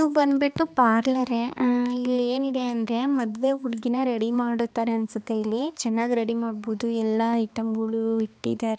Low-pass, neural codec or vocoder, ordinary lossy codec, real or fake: none; codec, 16 kHz, 2 kbps, X-Codec, HuBERT features, trained on balanced general audio; none; fake